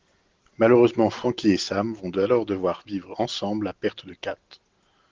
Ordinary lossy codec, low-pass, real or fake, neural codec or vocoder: Opus, 16 kbps; 7.2 kHz; real; none